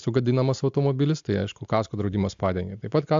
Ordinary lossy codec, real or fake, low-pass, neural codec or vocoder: AAC, 64 kbps; real; 7.2 kHz; none